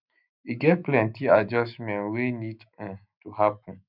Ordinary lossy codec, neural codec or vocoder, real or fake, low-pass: none; autoencoder, 48 kHz, 128 numbers a frame, DAC-VAE, trained on Japanese speech; fake; 5.4 kHz